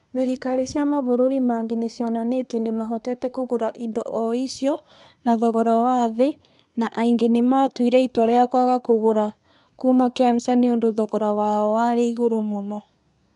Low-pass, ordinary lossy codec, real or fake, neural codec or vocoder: 10.8 kHz; none; fake; codec, 24 kHz, 1 kbps, SNAC